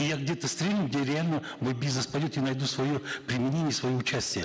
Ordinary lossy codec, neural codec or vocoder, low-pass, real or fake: none; none; none; real